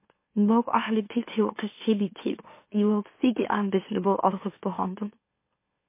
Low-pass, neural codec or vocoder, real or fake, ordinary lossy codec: 3.6 kHz; autoencoder, 44.1 kHz, a latent of 192 numbers a frame, MeloTTS; fake; MP3, 24 kbps